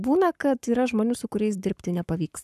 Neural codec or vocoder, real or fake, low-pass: codec, 44.1 kHz, 7.8 kbps, Pupu-Codec; fake; 14.4 kHz